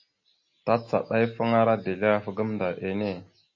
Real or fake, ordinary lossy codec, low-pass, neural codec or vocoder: real; MP3, 32 kbps; 7.2 kHz; none